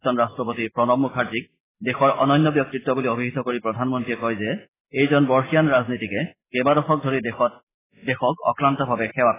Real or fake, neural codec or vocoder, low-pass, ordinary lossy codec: real; none; 3.6 kHz; AAC, 16 kbps